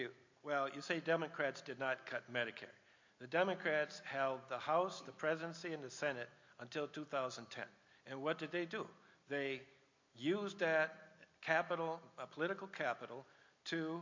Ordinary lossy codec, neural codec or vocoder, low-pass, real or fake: MP3, 48 kbps; none; 7.2 kHz; real